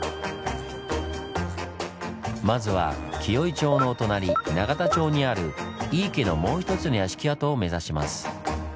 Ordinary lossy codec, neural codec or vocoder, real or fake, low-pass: none; none; real; none